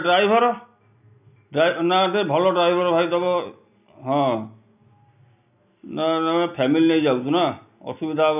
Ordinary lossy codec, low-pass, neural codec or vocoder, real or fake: none; 3.6 kHz; none; real